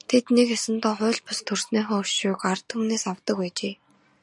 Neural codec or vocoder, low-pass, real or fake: none; 10.8 kHz; real